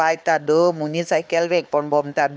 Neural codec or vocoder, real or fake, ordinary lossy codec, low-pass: codec, 16 kHz, 4 kbps, X-Codec, HuBERT features, trained on LibriSpeech; fake; none; none